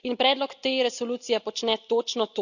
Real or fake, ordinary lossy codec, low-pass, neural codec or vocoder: real; none; 7.2 kHz; none